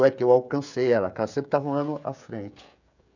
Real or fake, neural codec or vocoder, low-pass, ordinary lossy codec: fake; codec, 16 kHz, 16 kbps, FreqCodec, smaller model; 7.2 kHz; none